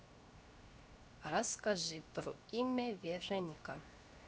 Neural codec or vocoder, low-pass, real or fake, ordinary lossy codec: codec, 16 kHz, 0.7 kbps, FocalCodec; none; fake; none